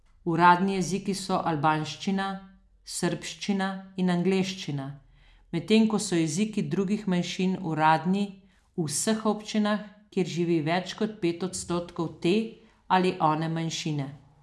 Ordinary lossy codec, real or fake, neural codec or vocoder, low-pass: none; real; none; none